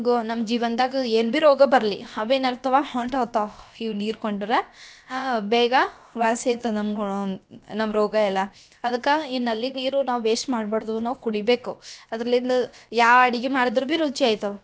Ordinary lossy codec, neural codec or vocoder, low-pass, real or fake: none; codec, 16 kHz, about 1 kbps, DyCAST, with the encoder's durations; none; fake